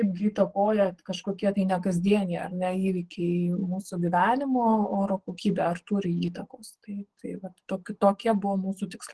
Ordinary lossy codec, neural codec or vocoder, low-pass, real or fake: Opus, 16 kbps; codec, 44.1 kHz, 7.8 kbps, DAC; 10.8 kHz; fake